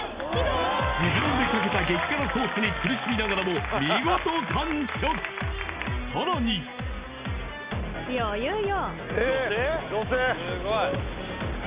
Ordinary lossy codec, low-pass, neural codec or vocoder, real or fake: Opus, 64 kbps; 3.6 kHz; none; real